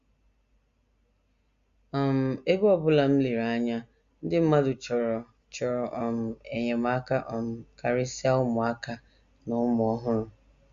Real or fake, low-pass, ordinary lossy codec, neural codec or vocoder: real; 7.2 kHz; Opus, 64 kbps; none